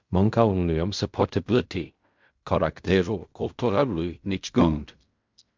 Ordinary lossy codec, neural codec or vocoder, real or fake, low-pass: MP3, 64 kbps; codec, 16 kHz in and 24 kHz out, 0.4 kbps, LongCat-Audio-Codec, fine tuned four codebook decoder; fake; 7.2 kHz